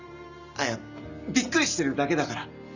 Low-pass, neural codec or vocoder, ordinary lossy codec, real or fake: 7.2 kHz; none; Opus, 64 kbps; real